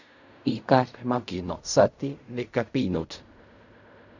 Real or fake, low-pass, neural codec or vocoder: fake; 7.2 kHz; codec, 16 kHz in and 24 kHz out, 0.4 kbps, LongCat-Audio-Codec, fine tuned four codebook decoder